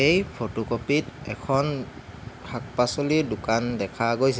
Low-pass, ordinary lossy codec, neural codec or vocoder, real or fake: none; none; none; real